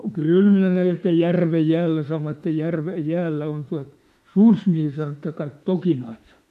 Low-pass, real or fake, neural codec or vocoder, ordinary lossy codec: 14.4 kHz; fake; autoencoder, 48 kHz, 32 numbers a frame, DAC-VAE, trained on Japanese speech; MP3, 64 kbps